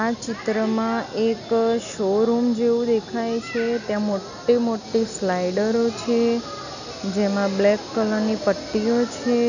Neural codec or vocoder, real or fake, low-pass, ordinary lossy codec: none; real; 7.2 kHz; none